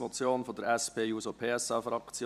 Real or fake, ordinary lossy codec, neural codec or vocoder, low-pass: fake; none; vocoder, 44.1 kHz, 128 mel bands every 256 samples, BigVGAN v2; 14.4 kHz